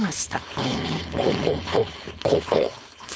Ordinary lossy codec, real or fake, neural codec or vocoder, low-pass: none; fake; codec, 16 kHz, 4.8 kbps, FACodec; none